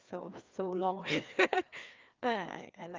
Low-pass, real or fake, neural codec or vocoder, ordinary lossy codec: 7.2 kHz; fake; codec, 16 kHz, 2 kbps, FreqCodec, larger model; Opus, 32 kbps